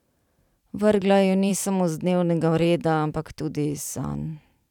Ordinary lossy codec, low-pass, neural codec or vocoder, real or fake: none; 19.8 kHz; vocoder, 44.1 kHz, 128 mel bands every 512 samples, BigVGAN v2; fake